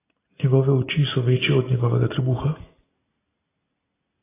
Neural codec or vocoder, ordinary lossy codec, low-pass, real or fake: none; AAC, 16 kbps; 3.6 kHz; real